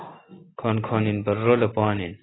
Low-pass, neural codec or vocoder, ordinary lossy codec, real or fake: 7.2 kHz; none; AAC, 16 kbps; real